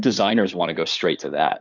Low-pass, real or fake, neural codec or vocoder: 7.2 kHz; fake; codec, 16 kHz in and 24 kHz out, 2.2 kbps, FireRedTTS-2 codec